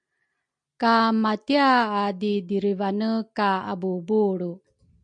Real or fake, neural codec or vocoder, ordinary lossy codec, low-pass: real; none; MP3, 64 kbps; 9.9 kHz